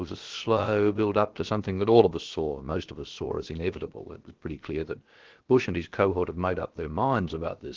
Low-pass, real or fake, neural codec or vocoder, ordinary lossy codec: 7.2 kHz; fake; codec, 16 kHz, about 1 kbps, DyCAST, with the encoder's durations; Opus, 16 kbps